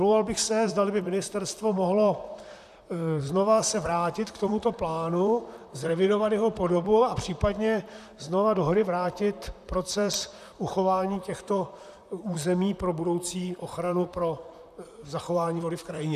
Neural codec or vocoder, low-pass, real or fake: vocoder, 44.1 kHz, 128 mel bands, Pupu-Vocoder; 14.4 kHz; fake